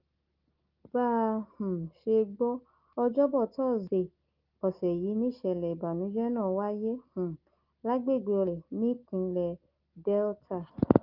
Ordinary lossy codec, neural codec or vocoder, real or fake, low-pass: Opus, 32 kbps; none; real; 5.4 kHz